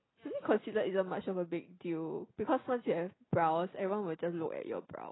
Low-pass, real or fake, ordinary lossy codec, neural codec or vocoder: 7.2 kHz; real; AAC, 16 kbps; none